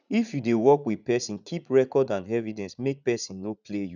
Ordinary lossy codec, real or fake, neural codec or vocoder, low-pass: none; fake; vocoder, 44.1 kHz, 80 mel bands, Vocos; 7.2 kHz